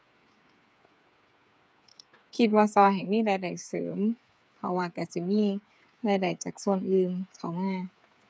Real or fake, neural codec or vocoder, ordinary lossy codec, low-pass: fake; codec, 16 kHz, 8 kbps, FreqCodec, smaller model; none; none